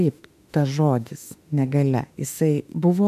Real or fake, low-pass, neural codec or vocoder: fake; 14.4 kHz; autoencoder, 48 kHz, 32 numbers a frame, DAC-VAE, trained on Japanese speech